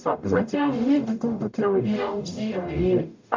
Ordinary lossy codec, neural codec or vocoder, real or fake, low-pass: MP3, 64 kbps; codec, 44.1 kHz, 0.9 kbps, DAC; fake; 7.2 kHz